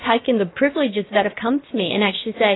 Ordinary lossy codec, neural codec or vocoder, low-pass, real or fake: AAC, 16 kbps; codec, 16 kHz, about 1 kbps, DyCAST, with the encoder's durations; 7.2 kHz; fake